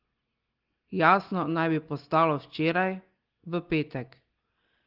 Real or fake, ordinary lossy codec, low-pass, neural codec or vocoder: real; Opus, 16 kbps; 5.4 kHz; none